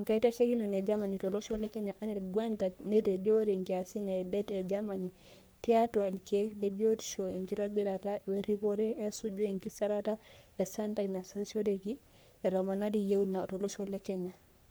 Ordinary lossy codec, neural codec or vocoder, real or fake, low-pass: none; codec, 44.1 kHz, 3.4 kbps, Pupu-Codec; fake; none